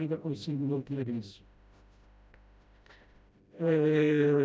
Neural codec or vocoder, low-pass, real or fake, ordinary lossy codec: codec, 16 kHz, 0.5 kbps, FreqCodec, smaller model; none; fake; none